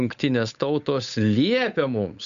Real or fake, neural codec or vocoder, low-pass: fake; codec, 16 kHz, 8 kbps, FunCodec, trained on Chinese and English, 25 frames a second; 7.2 kHz